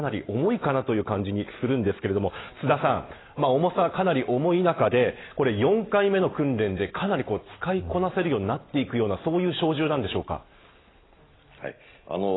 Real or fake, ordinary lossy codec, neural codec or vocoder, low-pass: real; AAC, 16 kbps; none; 7.2 kHz